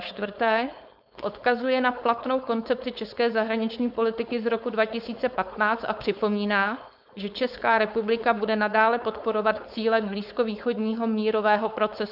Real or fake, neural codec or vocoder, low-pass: fake; codec, 16 kHz, 4.8 kbps, FACodec; 5.4 kHz